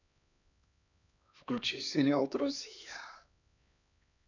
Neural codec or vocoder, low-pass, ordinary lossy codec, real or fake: codec, 16 kHz, 2 kbps, X-Codec, HuBERT features, trained on LibriSpeech; 7.2 kHz; none; fake